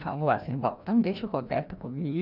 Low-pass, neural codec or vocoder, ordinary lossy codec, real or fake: 5.4 kHz; codec, 16 kHz, 1 kbps, FreqCodec, larger model; none; fake